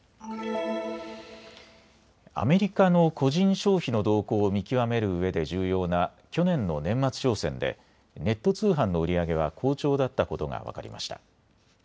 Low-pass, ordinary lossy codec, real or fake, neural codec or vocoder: none; none; real; none